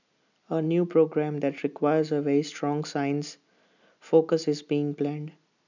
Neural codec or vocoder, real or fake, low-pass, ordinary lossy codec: none; real; 7.2 kHz; none